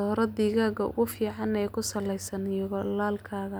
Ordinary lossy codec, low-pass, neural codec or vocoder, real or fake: none; none; none; real